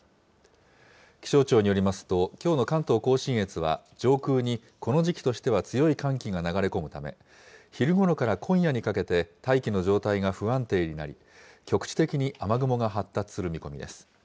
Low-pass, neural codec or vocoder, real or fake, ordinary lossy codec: none; none; real; none